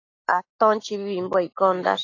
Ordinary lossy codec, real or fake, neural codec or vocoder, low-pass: AAC, 32 kbps; real; none; 7.2 kHz